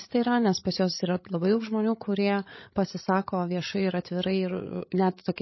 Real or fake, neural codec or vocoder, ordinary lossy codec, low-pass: fake; codec, 16 kHz, 16 kbps, FreqCodec, larger model; MP3, 24 kbps; 7.2 kHz